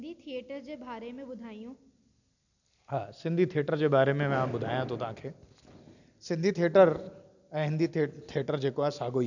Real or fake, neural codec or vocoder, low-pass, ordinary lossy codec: real; none; 7.2 kHz; none